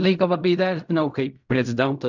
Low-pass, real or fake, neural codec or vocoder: 7.2 kHz; fake; codec, 16 kHz in and 24 kHz out, 0.4 kbps, LongCat-Audio-Codec, fine tuned four codebook decoder